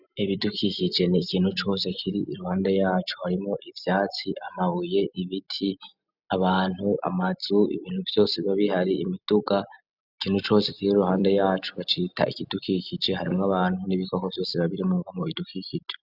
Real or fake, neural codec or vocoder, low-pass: real; none; 5.4 kHz